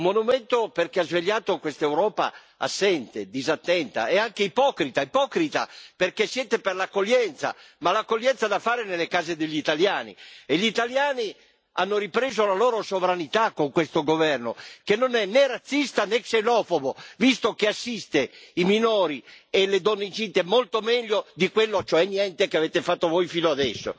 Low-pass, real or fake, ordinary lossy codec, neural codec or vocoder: none; real; none; none